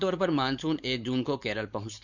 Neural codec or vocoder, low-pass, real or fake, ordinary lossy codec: codec, 16 kHz, 4.8 kbps, FACodec; 7.2 kHz; fake; none